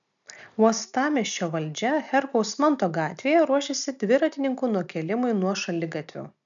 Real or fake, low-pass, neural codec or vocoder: real; 7.2 kHz; none